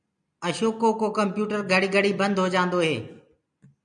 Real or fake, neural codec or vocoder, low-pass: real; none; 9.9 kHz